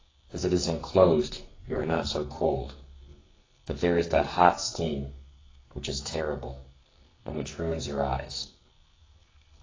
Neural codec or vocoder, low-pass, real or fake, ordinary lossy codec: codec, 44.1 kHz, 2.6 kbps, SNAC; 7.2 kHz; fake; AAC, 32 kbps